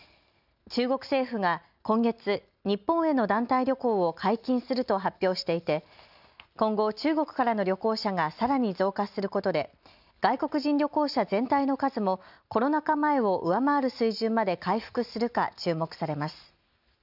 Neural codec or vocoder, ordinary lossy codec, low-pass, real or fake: none; none; 5.4 kHz; real